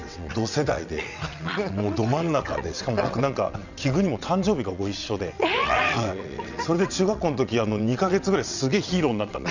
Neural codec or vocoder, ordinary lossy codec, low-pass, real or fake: vocoder, 22.05 kHz, 80 mel bands, WaveNeXt; none; 7.2 kHz; fake